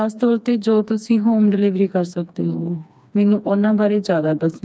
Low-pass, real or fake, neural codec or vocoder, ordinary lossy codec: none; fake; codec, 16 kHz, 2 kbps, FreqCodec, smaller model; none